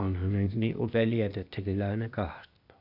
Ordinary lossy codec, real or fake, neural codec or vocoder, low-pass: none; fake; codec, 16 kHz, 0.8 kbps, ZipCodec; 5.4 kHz